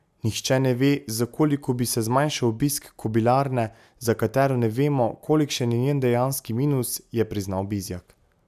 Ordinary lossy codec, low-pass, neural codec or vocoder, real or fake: none; 14.4 kHz; none; real